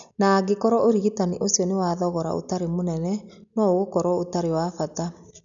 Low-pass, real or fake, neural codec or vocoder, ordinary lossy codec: 7.2 kHz; real; none; MP3, 96 kbps